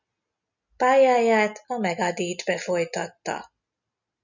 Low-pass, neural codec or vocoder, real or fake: 7.2 kHz; none; real